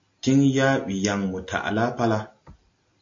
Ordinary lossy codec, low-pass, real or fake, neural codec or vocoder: AAC, 48 kbps; 7.2 kHz; real; none